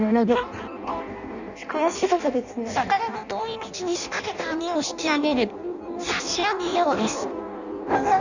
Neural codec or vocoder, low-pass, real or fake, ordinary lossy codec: codec, 16 kHz in and 24 kHz out, 0.6 kbps, FireRedTTS-2 codec; 7.2 kHz; fake; none